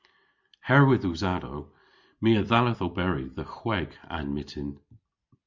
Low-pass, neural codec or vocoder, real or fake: 7.2 kHz; none; real